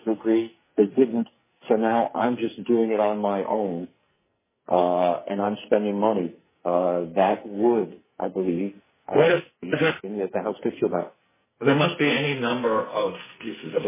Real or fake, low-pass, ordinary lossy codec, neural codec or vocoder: fake; 3.6 kHz; MP3, 16 kbps; codec, 44.1 kHz, 2.6 kbps, SNAC